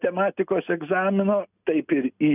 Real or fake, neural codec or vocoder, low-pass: real; none; 3.6 kHz